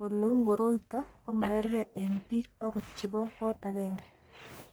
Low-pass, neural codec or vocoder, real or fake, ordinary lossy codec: none; codec, 44.1 kHz, 1.7 kbps, Pupu-Codec; fake; none